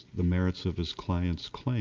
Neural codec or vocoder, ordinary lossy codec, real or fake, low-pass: none; Opus, 24 kbps; real; 7.2 kHz